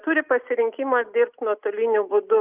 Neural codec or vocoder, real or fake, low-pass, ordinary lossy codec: none; real; 3.6 kHz; Opus, 24 kbps